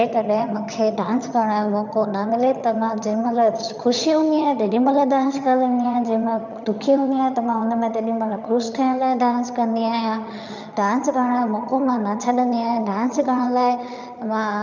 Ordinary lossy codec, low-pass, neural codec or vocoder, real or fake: none; 7.2 kHz; vocoder, 22.05 kHz, 80 mel bands, HiFi-GAN; fake